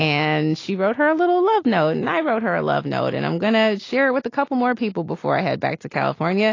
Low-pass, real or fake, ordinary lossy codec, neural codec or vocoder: 7.2 kHz; real; AAC, 32 kbps; none